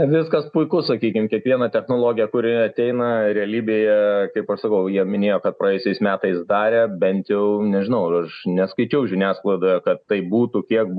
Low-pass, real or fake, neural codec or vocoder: 9.9 kHz; real; none